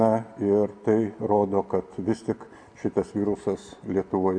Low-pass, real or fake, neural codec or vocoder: 9.9 kHz; real; none